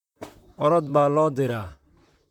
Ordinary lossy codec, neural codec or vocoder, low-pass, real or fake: none; vocoder, 44.1 kHz, 128 mel bands, Pupu-Vocoder; 19.8 kHz; fake